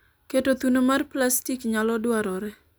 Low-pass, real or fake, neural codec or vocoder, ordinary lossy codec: none; real; none; none